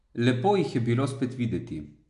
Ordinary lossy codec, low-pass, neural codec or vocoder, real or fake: none; 10.8 kHz; none; real